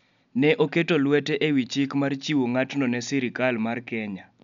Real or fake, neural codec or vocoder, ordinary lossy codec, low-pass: real; none; none; 7.2 kHz